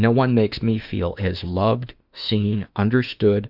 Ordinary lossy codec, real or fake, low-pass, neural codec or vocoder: Opus, 64 kbps; fake; 5.4 kHz; autoencoder, 48 kHz, 32 numbers a frame, DAC-VAE, trained on Japanese speech